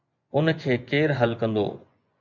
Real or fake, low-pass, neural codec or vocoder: fake; 7.2 kHz; vocoder, 24 kHz, 100 mel bands, Vocos